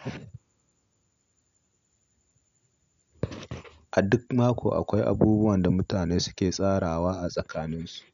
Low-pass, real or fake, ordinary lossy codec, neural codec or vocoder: 7.2 kHz; real; none; none